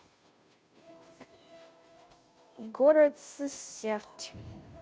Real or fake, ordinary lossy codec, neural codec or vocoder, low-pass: fake; none; codec, 16 kHz, 0.5 kbps, FunCodec, trained on Chinese and English, 25 frames a second; none